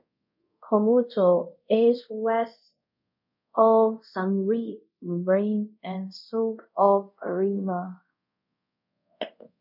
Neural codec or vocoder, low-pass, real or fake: codec, 24 kHz, 0.5 kbps, DualCodec; 5.4 kHz; fake